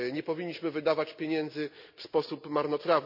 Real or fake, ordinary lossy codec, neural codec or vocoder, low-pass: real; none; none; 5.4 kHz